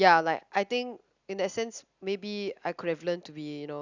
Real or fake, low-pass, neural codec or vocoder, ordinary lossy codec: real; 7.2 kHz; none; Opus, 64 kbps